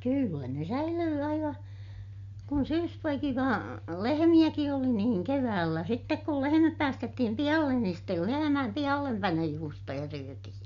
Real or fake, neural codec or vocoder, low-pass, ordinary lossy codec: real; none; 7.2 kHz; MP3, 64 kbps